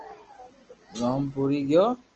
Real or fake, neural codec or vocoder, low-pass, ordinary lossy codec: real; none; 7.2 kHz; Opus, 16 kbps